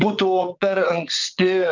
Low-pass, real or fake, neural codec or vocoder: 7.2 kHz; fake; vocoder, 22.05 kHz, 80 mel bands, WaveNeXt